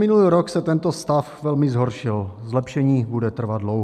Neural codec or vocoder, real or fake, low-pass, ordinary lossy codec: none; real; 14.4 kHz; MP3, 96 kbps